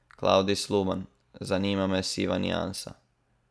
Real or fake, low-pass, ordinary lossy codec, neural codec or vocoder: real; none; none; none